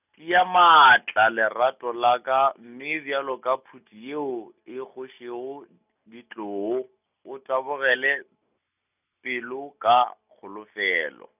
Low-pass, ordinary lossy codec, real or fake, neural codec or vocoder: 3.6 kHz; none; real; none